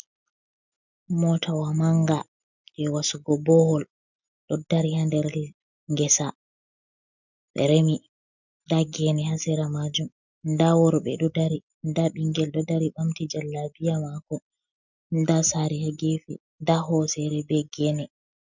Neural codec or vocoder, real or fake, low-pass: none; real; 7.2 kHz